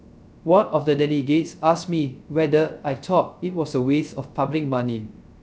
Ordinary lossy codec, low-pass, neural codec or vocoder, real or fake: none; none; codec, 16 kHz, 0.3 kbps, FocalCodec; fake